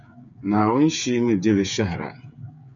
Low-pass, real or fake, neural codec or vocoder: 7.2 kHz; fake; codec, 16 kHz, 8 kbps, FreqCodec, smaller model